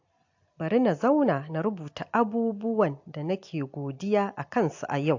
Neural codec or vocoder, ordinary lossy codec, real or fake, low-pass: none; AAC, 48 kbps; real; 7.2 kHz